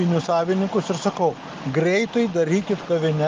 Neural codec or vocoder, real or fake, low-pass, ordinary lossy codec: none; real; 7.2 kHz; Opus, 32 kbps